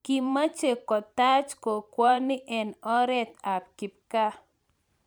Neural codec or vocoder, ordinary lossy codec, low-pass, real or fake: vocoder, 44.1 kHz, 128 mel bands every 512 samples, BigVGAN v2; none; none; fake